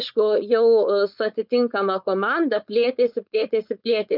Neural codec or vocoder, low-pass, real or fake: codec, 16 kHz, 4.8 kbps, FACodec; 5.4 kHz; fake